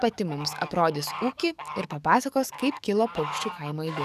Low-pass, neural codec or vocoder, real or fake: 14.4 kHz; codec, 44.1 kHz, 7.8 kbps, DAC; fake